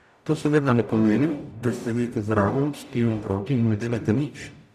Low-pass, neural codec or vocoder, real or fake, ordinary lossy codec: 14.4 kHz; codec, 44.1 kHz, 0.9 kbps, DAC; fake; none